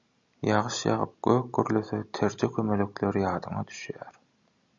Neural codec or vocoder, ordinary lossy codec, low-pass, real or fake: none; MP3, 64 kbps; 7.2 kHz; real